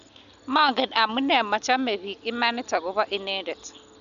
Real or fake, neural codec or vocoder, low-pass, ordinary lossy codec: real; none; 7.2 kHz; none